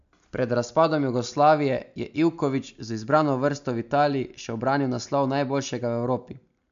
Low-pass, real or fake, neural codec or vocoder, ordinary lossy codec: 7.2 kHz; real; none; MP3, 64 kbps